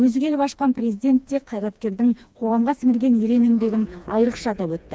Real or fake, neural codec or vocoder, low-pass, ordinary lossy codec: fake; codec, 16 kHz, 2 kbps, FreqCodec, smaller model; none; none